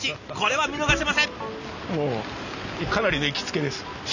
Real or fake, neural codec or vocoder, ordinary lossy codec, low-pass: real; none; none; 7.2 kHz